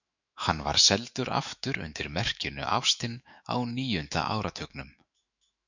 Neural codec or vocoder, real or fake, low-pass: autoencoder, 48 kHz, 128 numbers a frame, DAC-VAE, trained on Japanese speech; fake; 7.2 kHz